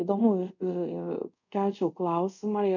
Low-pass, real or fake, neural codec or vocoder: 7.2 kHz; fake; codec, 24 kHz, 0.5 kbps, DualCodec